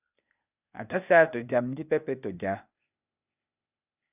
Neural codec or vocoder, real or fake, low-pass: codec, 16 kHz, 0.8 kbps, ZipCodec; fake; 3.6 kHz